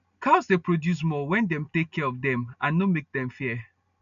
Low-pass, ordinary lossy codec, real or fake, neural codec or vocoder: 7.2 kHz; AAC, 96 kbps; real; none